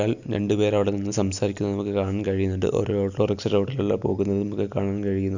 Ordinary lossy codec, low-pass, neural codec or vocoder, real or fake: none; 7.2 kHz; none; real